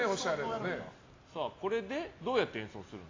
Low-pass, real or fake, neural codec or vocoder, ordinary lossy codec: 7.2 kHz; real; none; AAC, 32 kbps